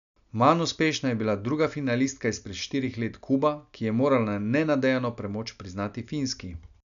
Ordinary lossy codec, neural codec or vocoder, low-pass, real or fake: none; none; 7.2 kHz; real